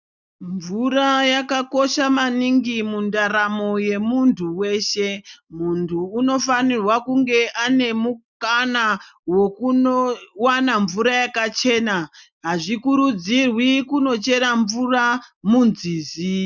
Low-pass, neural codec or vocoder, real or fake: 7.2 kHz; none; real